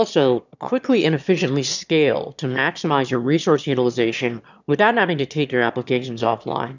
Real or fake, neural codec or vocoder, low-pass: fake; autoencoder, 22.05 kHz, a latent of 192 numbers a frame, VITS, trained on one speaker; 7.2 kHz